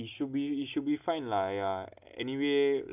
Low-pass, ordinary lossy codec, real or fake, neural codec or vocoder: 3.6 kHz; none; real; none